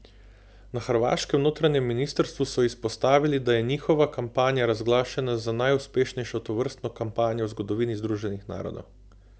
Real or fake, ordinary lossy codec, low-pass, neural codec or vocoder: real; none; none; none